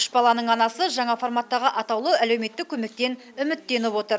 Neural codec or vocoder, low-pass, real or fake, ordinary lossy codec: none; none; real; none